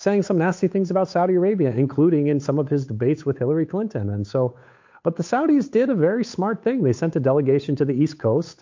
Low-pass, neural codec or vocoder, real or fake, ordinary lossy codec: 7.2 kHz; codec, 16 kHz, 8 kbps, FunCodec, trained on Chinese and English, 25 frames a second; fake; MP3, 48 kbps